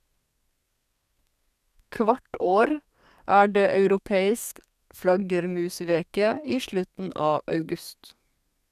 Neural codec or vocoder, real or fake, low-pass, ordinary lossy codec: codec, 32 kHz, 1.9 kbps, SNAC; fake; 14.4 kHz; none